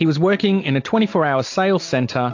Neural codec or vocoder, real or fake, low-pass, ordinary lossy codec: none; real; 7.2 kHz; AAC, 48 kbps